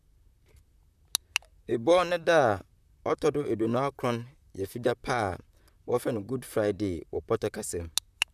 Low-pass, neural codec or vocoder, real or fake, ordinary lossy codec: 14.4 kHz; vocoder, 44.1 kHz, 128 mel bands, Pupu-Vocoder; fake; none